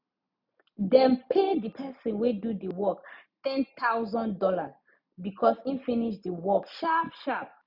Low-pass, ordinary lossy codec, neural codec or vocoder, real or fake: 5.4 kHz; none; none; real